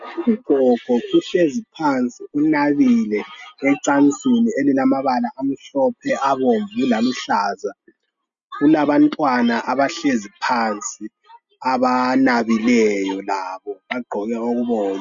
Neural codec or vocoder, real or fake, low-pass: none; real; 7.2 kHz